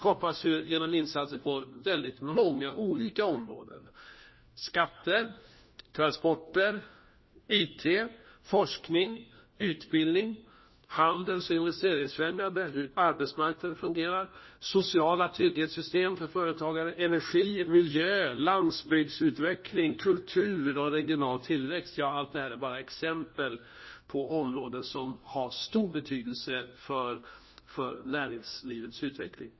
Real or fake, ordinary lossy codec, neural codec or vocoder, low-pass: fake; MP3, 24 kbps; codec, 16 kHz, 1 kbps, FunCodec, trained on LibriTTS, 50 frames a second; 7.2 kHz